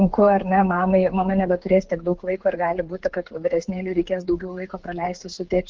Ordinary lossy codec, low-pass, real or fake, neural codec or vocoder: Opus, 16 kbps; 7.2 kHz; fake; codec, 16 kHz, 8 kbps, FreqCodec, smaller model